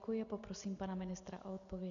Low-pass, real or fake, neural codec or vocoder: 7.2 kHz; real; none